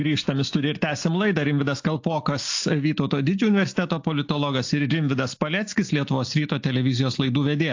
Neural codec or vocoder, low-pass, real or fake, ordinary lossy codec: none; 7.2 kHz; real; AAC, 48 kbps